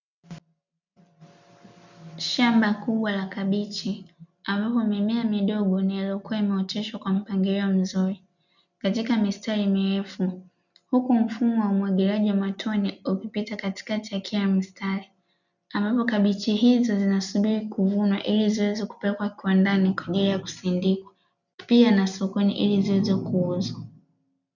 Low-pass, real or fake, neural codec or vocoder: 7.2 kHz; real; none